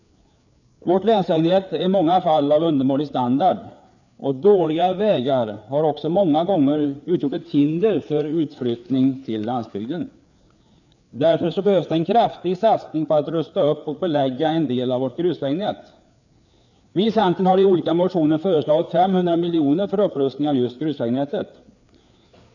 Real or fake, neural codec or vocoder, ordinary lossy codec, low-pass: fake; codec, 16 kHz, 4 kbps, FreqCodec, larger model; none; 7.2 kHz